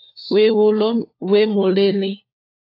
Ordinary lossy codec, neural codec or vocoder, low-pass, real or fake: AAC, 32 kbps; codec, 16 kHz, 4 kbps, FunCodec, trained on LibriTTS, 50 frames a second; 5.4 kHz; fake